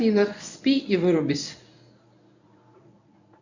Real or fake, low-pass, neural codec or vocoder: fake; 7.2 kHz; codec, 24 kHz, 0.9 kbps, WavTokenizer, medium speech release version 1